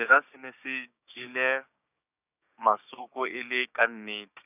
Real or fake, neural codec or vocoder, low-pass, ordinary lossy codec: fake; codec, 16 kHz, 6 kbps, DAC; 3.6 kHz; none